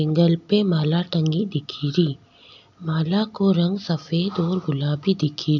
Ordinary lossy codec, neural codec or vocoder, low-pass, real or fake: none; none; 7.2 kHz; real